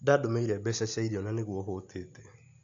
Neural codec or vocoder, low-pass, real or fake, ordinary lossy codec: none; 7.2 kHz; real; AAC, 48 kbps